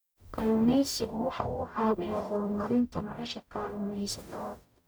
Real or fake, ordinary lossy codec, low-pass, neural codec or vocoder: fake; none; none; codec, 44.1 kHz, 0.9 kbps, DAC